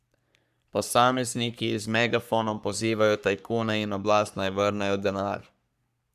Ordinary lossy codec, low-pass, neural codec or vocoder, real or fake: none; 14.4 kHz; codec, 44.1 kHz, 3.4 kbps, Pupu-Codec; fake